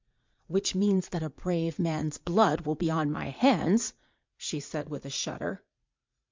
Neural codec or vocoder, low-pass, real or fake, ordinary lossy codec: vocoder, 44.1 kHz, 80 mel bands, Vocos; 7.2 kHz; fake; MP3, 64 kbps